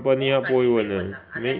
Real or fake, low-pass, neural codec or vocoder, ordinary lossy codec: real; 5.4 kHz; none; none